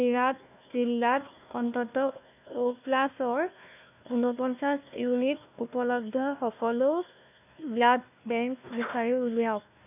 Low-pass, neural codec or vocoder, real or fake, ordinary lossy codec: 3.6 kHz; codec, 16 kHz, 1 kbps, FunCodec, trained on Chinese and English, 50 frames a second; fake; none